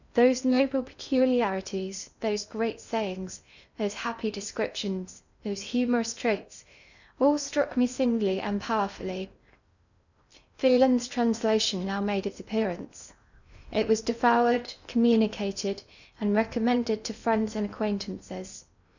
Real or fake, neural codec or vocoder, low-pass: fake; codec, 16 kHz in and 24 kHz out, 0.6 kbps, FocalCodec, streaming, 2048 codes; 7.2 kHz